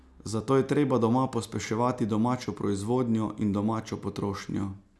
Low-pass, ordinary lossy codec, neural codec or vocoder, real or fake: none; none; none; real